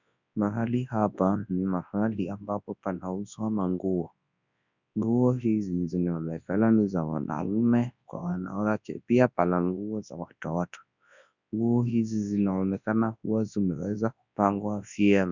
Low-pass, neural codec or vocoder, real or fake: 7.2 kHz; codec, 24 kHz, 0.9 kbps, WavTokenizer, large speech release; fake